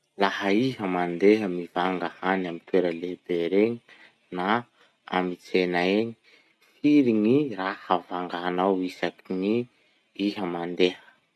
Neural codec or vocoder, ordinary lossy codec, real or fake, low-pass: none; none; real; none